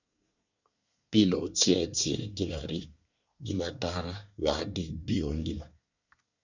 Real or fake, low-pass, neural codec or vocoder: fake; 7.2 kHz; codec, 24 kHz, 1 kbps, SNAC